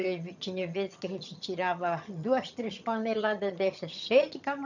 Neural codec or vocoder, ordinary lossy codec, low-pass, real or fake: vocoder, 22.05 kHz, 80 mel bands, HiFi-GAN; none; 7.2 kHz; fake